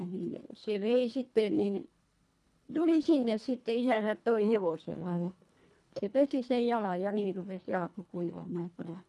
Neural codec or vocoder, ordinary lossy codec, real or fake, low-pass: codec, 24 kHz, 1.5 kbps, HILCodec; none; fake; none